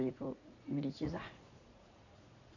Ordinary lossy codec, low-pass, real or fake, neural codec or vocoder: none; 7.2 kHz; fake; vocoder, 44.1 kHz, 80 mel bands, Vocos